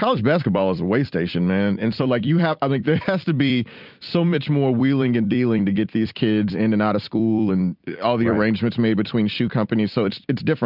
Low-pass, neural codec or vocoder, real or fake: 5.4 kHz; vocoder, 44.1 kHz, 128 mel bands every 256 samples, BigVGAN v2; fake